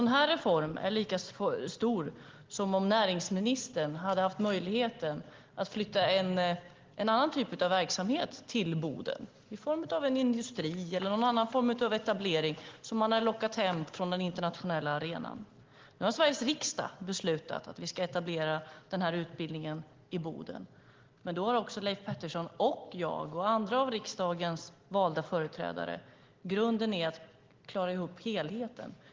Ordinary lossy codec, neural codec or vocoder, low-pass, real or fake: Opus, 16 kbps; none; 7.2 kHz; real